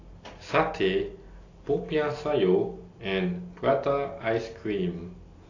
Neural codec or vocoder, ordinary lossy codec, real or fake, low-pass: none; AAC, 32 kbps; real; 7.2 kHz